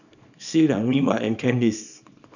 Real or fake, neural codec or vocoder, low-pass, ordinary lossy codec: fake; codec, 24 kHz, 0.9 kbps, WavTokenizer, small release; 7.2 kHz; none